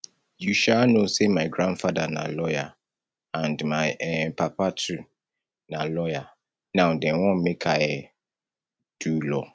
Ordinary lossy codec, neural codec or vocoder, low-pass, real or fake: none; none; none; real